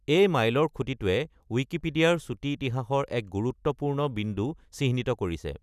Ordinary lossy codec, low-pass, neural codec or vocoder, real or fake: none; 14.4 kHz; none; real